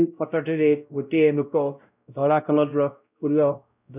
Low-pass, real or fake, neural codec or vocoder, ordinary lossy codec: 3.6 kHz; fake; codec, 16 kHz, 0.5 kbps, X-Codec, WavLM features, trained on Multilingual LibriSpeech; none